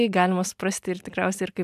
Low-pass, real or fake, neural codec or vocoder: 14.4 kHz; real; none